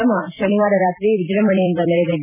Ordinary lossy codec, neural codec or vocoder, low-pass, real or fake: none; vocoder, 44.1 kHz, 128 mel bands every 256 samples, BigVGAN v2; 3.6 kHz; fake